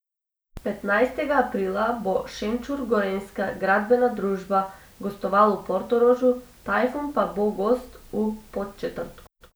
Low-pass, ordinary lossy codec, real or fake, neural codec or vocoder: none; none; real; none